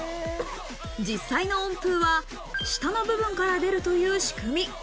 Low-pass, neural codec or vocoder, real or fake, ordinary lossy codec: none; none; real; none